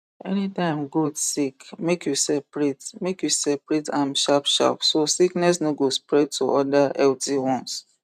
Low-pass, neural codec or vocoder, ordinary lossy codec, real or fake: 14.4 kHz; vocoder, 44.1 kHz, 128 mel bands every 512 samples, BigVGAN v2; none; fake